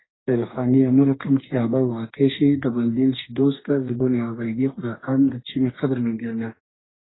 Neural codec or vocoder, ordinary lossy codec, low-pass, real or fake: codec, 44.1 kHz, 2.6 kbps, DAC; AAC, 16 kbps; 7.2 kHz; fake